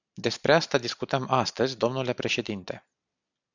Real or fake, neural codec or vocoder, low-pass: real; none; 7.2 kHz